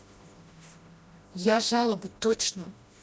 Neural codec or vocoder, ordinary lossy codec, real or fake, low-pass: codec, 16 kHz, 1 kbps, FreqCodec, smaller model; none; fake; none